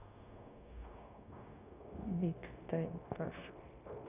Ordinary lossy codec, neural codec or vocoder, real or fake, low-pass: none; codec, 16 kHz, 0.9 kbps, LongCat-Audio-Codec; fake; 3.6 kHz